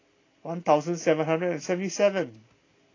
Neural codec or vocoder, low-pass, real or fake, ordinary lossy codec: none; 7.2 kHz; real; AAC, 32 kbps